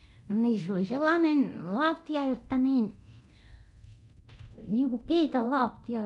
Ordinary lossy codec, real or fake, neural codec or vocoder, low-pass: none; fake; codec, 24 kHz, 0.9 kbps, DualCodec; 10.8 kHz